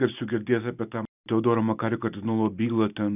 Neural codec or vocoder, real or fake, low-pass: none; real; 3.6 kHz